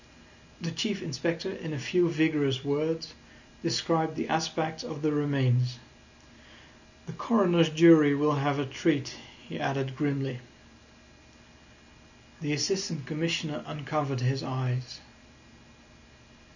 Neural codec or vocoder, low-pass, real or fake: none; 7.2 kHz; real